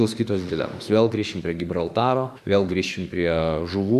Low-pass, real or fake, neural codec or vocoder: 14.4 kHz; fake; autoencoder, 48 kHz, 32 numbers a frame, DAC-VAE, trained on Japanese speech